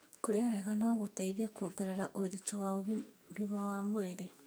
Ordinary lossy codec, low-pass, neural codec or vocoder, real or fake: none; none; codec, 44.1 kHz, 2.6 kbps, SNAC; fake